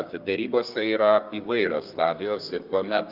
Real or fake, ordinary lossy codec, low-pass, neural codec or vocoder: fake; Opus, 24 kbps; 5.4 kHz; codec, 32 kHz, 1.9 kbps, SNAC